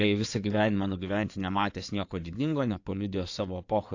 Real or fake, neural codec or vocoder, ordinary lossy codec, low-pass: fake; codec, 16 kHz in and 24 kHz out, 2.2 kbps, FireRedTTS-2 codec; AAC, 48 kbps; 7.2 kHz